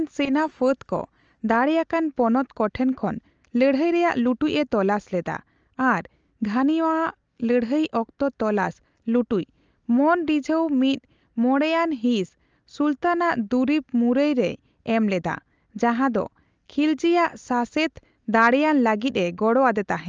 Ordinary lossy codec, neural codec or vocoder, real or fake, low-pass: Opus, 24 kbps; none; real; 7.2 kHz